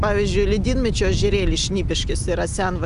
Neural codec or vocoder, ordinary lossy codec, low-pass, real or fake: none; AAC, 96 kbps; 10.8 kHz; real